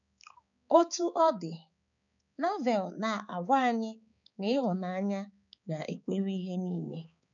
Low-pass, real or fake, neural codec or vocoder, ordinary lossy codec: 7.2 kHz; fake; codec, 16 kHz, 4 kbps, X-Codec, HuBERT features, trained on balanced general audio; none